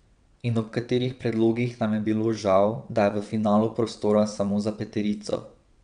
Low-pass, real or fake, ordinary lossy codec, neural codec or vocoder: 9.9 kHz; fake; none; vocoder, 22.05 kHz, 80 mel bands, WaveNeXt